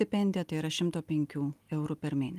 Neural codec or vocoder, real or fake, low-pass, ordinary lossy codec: none; real; 14.4 kHz; Opus, 32 kbps